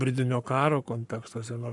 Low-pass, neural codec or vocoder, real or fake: 10.8 kHz; codec, 44.1 kHz, 7.8 kbps, Pupu-Codec; fake